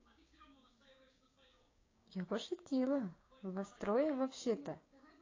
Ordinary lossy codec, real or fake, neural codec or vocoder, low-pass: AAC, 32 kbps; fake; codec, 16 kHz, 6 kbps, DAC; 7.2 kHz